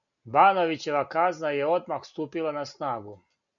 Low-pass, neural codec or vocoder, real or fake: 7.2 kHz; none; real